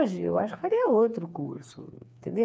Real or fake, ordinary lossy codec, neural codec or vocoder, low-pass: fake; none; codec, 16 kHz, 4 kbps, FreqCodec, smaller model; none